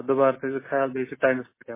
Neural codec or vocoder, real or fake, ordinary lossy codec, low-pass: none; real; MP3, 16 kbps; 3.6 kHz